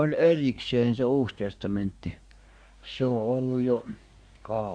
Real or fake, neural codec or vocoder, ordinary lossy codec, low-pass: fake; codec, 24 kHz, 1 kbps, SNAC; Opus, 64 kbps; 9.9 kHz